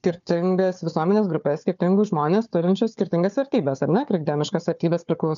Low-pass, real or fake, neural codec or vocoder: 7.2 kHz; fake; codec, 16 kHz, 4 kbps, FunCodec, trained on Chinese and English, 50 frames a second